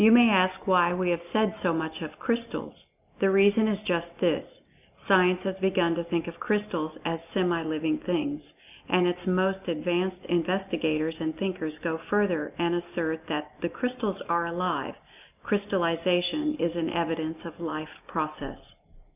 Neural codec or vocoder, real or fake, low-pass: none; real; 3.6 kHz